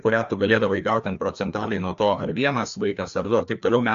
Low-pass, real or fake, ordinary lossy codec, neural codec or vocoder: 7.2 kHz; fake; AAC, 64 kbps; codec, 16 kHz, 2 kbps, FreqCodec, larger model